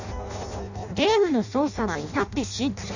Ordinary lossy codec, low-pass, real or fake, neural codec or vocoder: none; 7.2 kHz; fake; codec, 16 kHz in and 24 kHz out, 0.6 kbps, FireRedTTS-2 codec